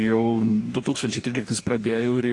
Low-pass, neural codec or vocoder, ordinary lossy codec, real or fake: 10.8 kHz; codec, 44.1 kHz, 2.6 kbps, DAC; AAC, 48 kbps; fake